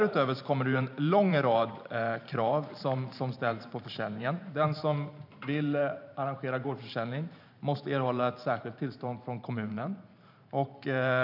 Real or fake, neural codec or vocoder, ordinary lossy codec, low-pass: fake; vocoder, 44.1 kHz, 128 mel bands every 512 samples, BigVGAN v2; none; 5.4 kHz